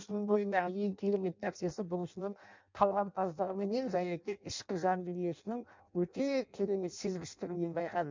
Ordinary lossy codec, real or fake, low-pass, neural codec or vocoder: MP3, 64 kbps; fake; 7.2 kHz; codec, 16 kHz in and 24 kHz out, 0.6 kbps, FireRedTTS-2 codec